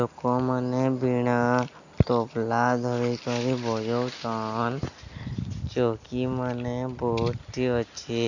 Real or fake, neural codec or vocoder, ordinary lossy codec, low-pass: real; none; none; 7.2 kHz